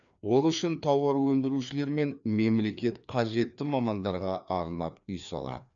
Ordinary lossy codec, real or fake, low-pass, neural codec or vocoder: none; fake; 7.2 kHz; codec, 16 kHz, 2 kbps, FreqCodec, larger model